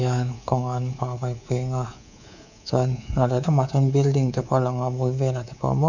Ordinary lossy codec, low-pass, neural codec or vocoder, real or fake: none; 7.2 kHz; codec, 24 kHz, 3.1 kbps, DualCodec; fake